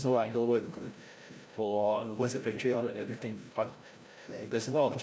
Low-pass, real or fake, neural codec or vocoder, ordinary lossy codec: none; fake; codec, 16 kHz, 0.5 kbps, FreqCodec, larger model; none